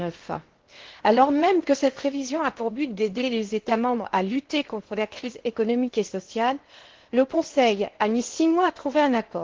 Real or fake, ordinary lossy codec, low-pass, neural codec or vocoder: fake; Opus, 16 kbps; 7.2 kHz; codec, 16 kHz in and 24 kHz out, 0.8 kbps, FocalCodec, streaming, 65536 codes